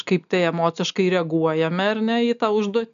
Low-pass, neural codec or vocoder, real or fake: 7.2 kHz; none; real